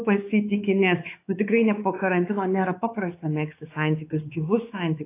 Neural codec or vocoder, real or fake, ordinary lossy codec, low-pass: codec, 16 kHz, 4 kbps, X-Codec, WavLM features, trained on Multilingual LibriSpeech; fake; AAC, 24 kbps; 3.6 kHz